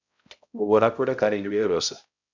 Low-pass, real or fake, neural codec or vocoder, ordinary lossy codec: 7.2 kHz; fake; codec, 16 kHz, 0.5 kbps, X-Codec, HuBERT features, trained on balanced general audio; MP3, 64 kbps